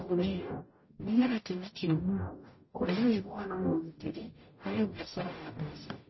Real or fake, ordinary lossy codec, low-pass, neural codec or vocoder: fake; MP3, 24 kbps; 7.2 kHz; codec, 44.1 kHz, 0.9 kbps, DAC